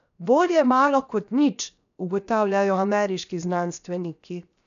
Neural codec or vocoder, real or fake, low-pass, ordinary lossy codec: codec, 16 kHz, 0.7 kbps, FocalCodec; fake; 7.2 kHz; none